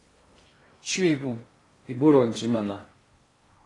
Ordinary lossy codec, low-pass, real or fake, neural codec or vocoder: AAC, 32 kbps; 10.8 kHz; fake; codec, 16 kHz in and 24 kHz out, 0.6 kbps, FocalCodec, streaming, 2048 codes